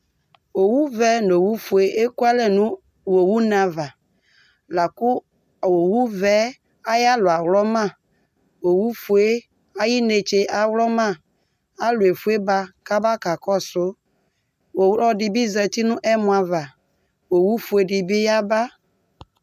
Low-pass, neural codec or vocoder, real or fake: 14.4 kHz; none; real